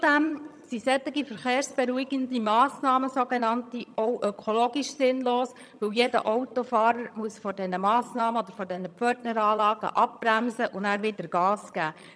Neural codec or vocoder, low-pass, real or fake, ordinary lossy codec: vocoder, 22.05 kHz, 80 mel bands, HiFi-GAN; none; fake; none